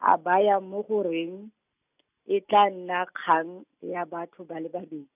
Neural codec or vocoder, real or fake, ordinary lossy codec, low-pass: none; real; none; 3.6 kHz